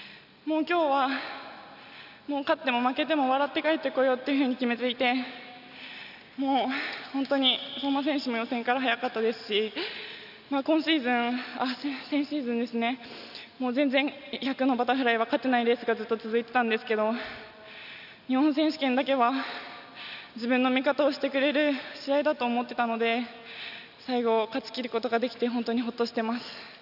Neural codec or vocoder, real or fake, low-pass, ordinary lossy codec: none; real; 5.4 kHz; none